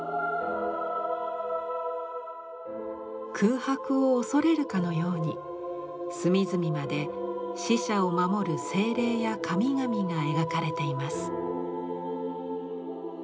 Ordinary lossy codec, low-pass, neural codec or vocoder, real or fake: none; none; none; real